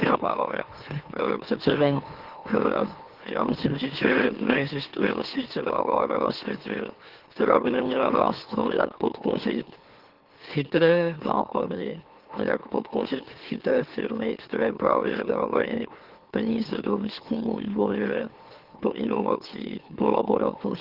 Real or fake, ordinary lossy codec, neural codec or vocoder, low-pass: fake; Opus, 16 kbps; autoencoder, 44.1 kHz, a latent of 192 numbers a frame, MeloTTS; 5.4 kHz